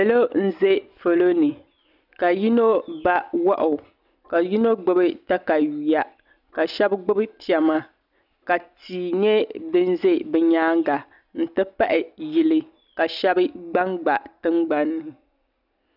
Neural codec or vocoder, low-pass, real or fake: none; 5.4 kHz; real